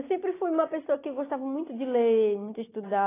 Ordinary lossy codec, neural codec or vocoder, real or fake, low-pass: AAC, 16 kbps; none; real; 3.6 kHz